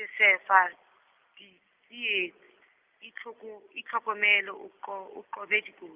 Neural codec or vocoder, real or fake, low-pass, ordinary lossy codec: none; real; 3.6 kHz; Opus, 24 kbps